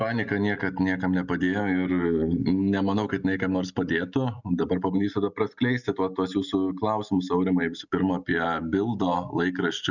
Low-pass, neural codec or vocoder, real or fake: 7.2 kHz; none; real